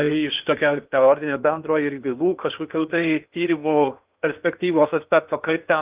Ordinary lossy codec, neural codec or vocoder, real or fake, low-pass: Opus, 24 kbps; codec, 16 kHz in and 24 kHz out, 0.8 kbps, FocalCodec, streaming, 65536 codes; fake; 3.6 kHz